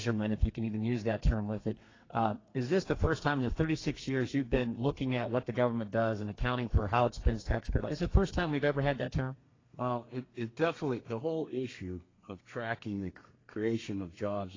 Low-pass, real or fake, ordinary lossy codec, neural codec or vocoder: 7.2 kHz; fake; AAC, 32 kbps; codec, 32 kHz, 1.9 kbps, SNAC